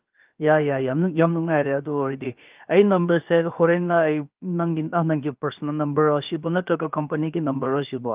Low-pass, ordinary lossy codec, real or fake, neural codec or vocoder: 3.6 kHz; Opus, 32 kbps; fake; codec, 16 kHz, about 1 kbps, DyCAST, with the encoder's durations